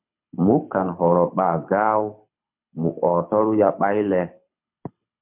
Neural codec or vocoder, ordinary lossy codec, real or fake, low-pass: codec, 24 kHz, 6 kbps, HILCodec; MP3, 32 kbps; fake; 3.6 kHz